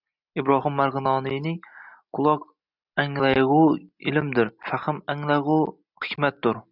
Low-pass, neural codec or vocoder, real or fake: 5.4 kHz; none; real